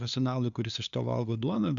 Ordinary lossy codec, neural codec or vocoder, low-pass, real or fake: MP3, 96 kbps; codec, 16 kHz, 2 kbps, FunCodec, trained on LibriTTS, 25 frames a second; 7.2 kHz; fake